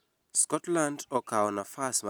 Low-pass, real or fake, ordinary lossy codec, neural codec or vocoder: none; real; none; none